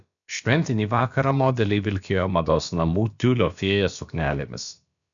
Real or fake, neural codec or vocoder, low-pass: fake; codec, 16 kHz, about 1 kbps, DyCAST, with the encoder's durations; 7.2 kHz